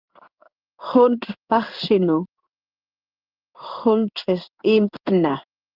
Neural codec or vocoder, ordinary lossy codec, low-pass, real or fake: codec, 16 kHz in and 24 kHz out, 1 kbps, XY-Tokenizer; Opus, 24 kbps; 5.4 kHz; fake